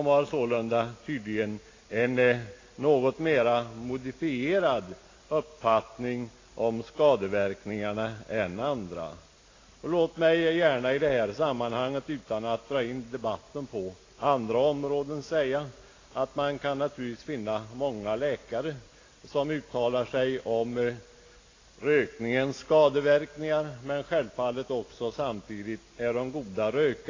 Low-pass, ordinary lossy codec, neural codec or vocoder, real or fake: 7.2 kHz; AAC, 32 kbps; none; real